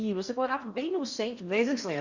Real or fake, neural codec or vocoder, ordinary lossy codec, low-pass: fake; codec, 16 kHz in and 24 kHz out, 0.8 kbps, FocalCodec, streaming, 65536 codes; Opus, 64 kbps; 7.2 kHz